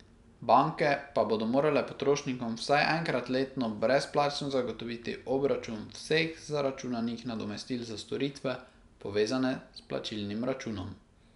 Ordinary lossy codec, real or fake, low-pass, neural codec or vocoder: none; real; 10.8 kHz; none